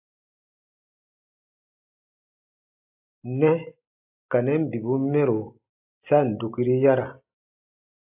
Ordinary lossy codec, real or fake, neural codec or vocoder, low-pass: AAC, 24 kbps; real; none; 3.6 kHz